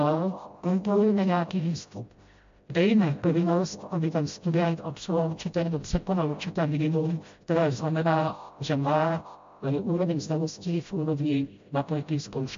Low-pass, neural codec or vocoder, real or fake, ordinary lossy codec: 7.2 kHz; codec, 16 kHz, 0.5 kbps, FreqCodec, smaller model; fake; MP3, 48 kbps